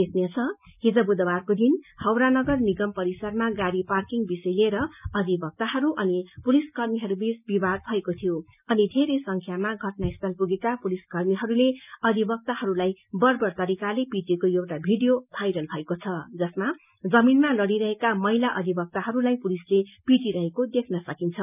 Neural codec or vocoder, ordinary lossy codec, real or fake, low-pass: none; none; real; 3.6 kHz